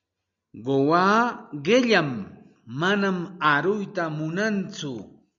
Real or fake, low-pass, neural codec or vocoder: real; 7.2 kHz; none